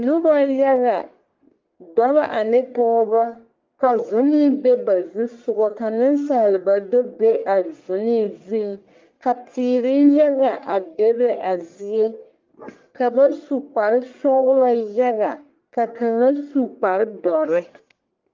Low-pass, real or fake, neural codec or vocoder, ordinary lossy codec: 7.2 kHz; fake; codec, 44.1 kHz, 1.7 kbps, Pupu-Codec; Opus, 32 kbps